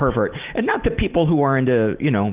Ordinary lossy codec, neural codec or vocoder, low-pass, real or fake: Opus, 24 kbps; none; 3.6 kHz; real